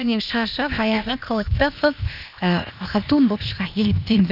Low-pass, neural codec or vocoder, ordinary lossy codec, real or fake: 5.4 kHz; codec, 16 kHz, 0.8 kbps, ZipCodec; none; fake